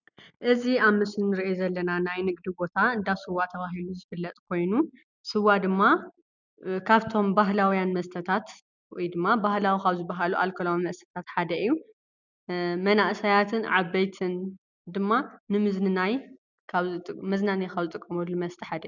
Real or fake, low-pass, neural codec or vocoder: real; 7.2 kHz; none